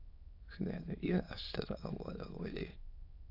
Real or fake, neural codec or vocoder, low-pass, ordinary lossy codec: fake; autoencoder, 22.05 kHz, a latent of 192 numbers a frame, VITS, trained on many speakers; 5.4 kHz; AAC, 48 kbps